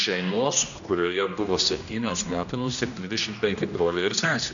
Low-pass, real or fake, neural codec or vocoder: 7.2 kHz; fake; codec, 16 kHz, 1 kbps, X-Codec, HuBERT features, trained on general audio